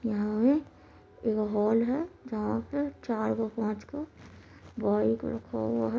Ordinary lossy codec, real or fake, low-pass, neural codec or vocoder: none; real; none; none